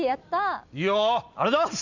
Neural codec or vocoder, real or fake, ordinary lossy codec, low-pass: none; real; none; 7.2 kHz